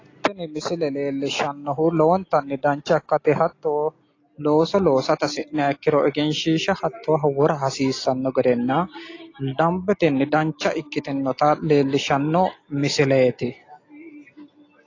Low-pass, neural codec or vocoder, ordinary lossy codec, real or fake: 7.2 kHz; none; AAC, 32 kbps; real